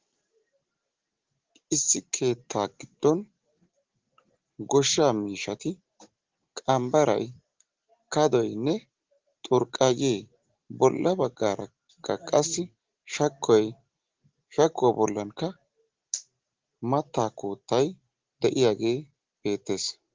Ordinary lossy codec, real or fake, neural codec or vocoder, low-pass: Opus, 16 kbps; real; none; 7.2 kHz